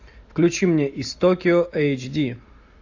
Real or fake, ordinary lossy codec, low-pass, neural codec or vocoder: real; AAC, 48 kbps; 7.2 kHz; none